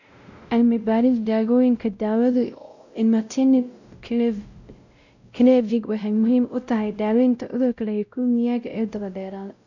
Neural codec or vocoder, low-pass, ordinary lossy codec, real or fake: codec, 16 kHz, 0.5 kbps, X-Codec, WavLM features, trained on Multilingual LibriSpeech; 7.2 kHz; none; fake